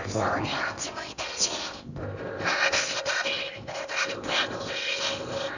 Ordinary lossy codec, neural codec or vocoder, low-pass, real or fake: none; codec, 16 kHz in and 24 kHz out, 0.8 kbps, FocalCodec, streaming, 65536 codes; 7.2 kHz; fake